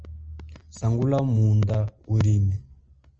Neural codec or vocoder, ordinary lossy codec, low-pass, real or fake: none; Opus, 24 kbps; 7.2 kHz; real